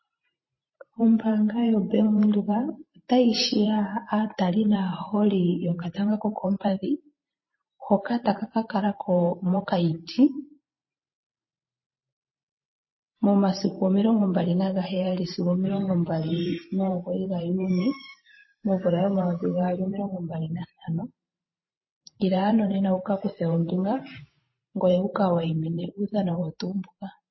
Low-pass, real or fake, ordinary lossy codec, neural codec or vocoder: 7.2 kHz; fake; MP3, 24 kbps; vocoder, 44.1 kHz, 128 mel bands every 512 samples, BigVGAN v2